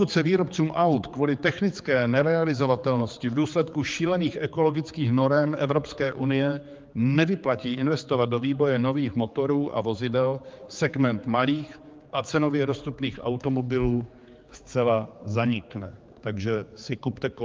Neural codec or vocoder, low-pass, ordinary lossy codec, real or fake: codec, 16 kHz, 4 kbps, X-Codec, HuBERT features, trained on general audio; 7.2 kHz; Opus, 24 kbps; fake